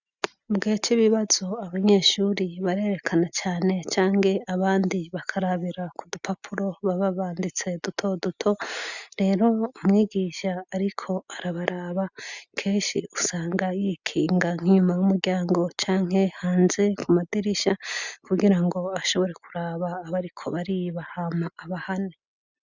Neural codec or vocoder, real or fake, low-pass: none; real; 7.2 kHz